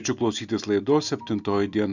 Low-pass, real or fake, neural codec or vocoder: 7.2 kHz; real; none